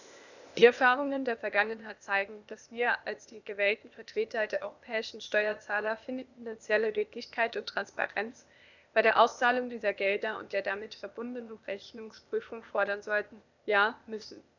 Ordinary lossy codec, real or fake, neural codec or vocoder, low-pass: none; fake; codec, 16 kHz, 0.8 kbps, ZipCodec; 7.2 kHz